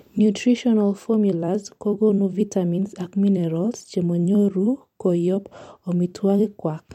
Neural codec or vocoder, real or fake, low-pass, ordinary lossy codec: vocoder, 44.1 kHz, 128 mel bands every 256 samples, BigVGAN v2; fake; 19.8 kHz; MP3, 64 kbps